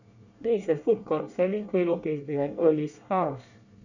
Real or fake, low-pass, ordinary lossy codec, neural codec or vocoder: fake; 7.2 kHz; none; codec, 24 kHz, 1 kbps, SNAC